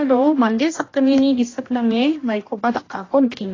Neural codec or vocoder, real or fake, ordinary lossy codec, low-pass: codec, 16 kHz, 1 kbps, X-Codec, HuBERT features, trained on general audio; fake; AAC, 32 kbps; 7.2 kHz